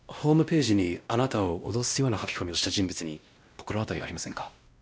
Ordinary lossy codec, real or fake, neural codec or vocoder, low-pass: none; fake; codec, 16 kHz, 1 kbps, X-Codec, WavLM features, trained on Multilingual LibriSpeech; none